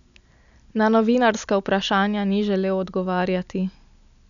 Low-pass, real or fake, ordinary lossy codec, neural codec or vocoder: 7.2 kHz; real; none; none